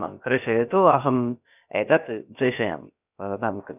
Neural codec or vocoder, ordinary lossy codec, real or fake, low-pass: codec, 16 kHz, about 1 kbps, DyCAST, with the encoder's durations; none; fake; 3.6 kHz